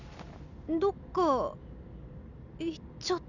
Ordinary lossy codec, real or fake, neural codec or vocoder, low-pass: none; real; none; 7.2 kHz